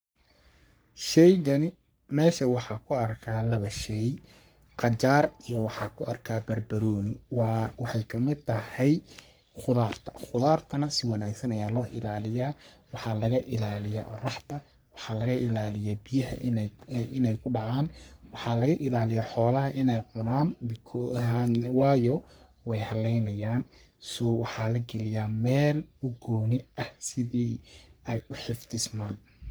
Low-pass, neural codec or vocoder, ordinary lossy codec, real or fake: none; codec, 44.1 kHz, 3.4 kbps, Pupu-Codec; none; fake